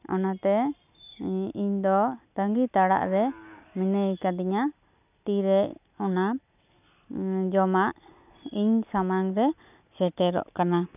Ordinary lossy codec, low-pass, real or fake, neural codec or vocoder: none; 3.6 kHz; real; none